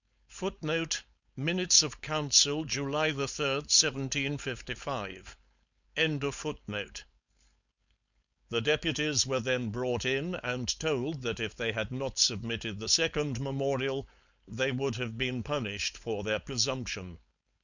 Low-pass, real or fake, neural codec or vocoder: 7.2 kHz; fake; codec, 16 kHz, 4.8 kbps, FACodec